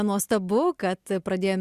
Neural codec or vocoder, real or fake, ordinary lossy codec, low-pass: none; real; Opus, 64 kbps; 14.4 kHz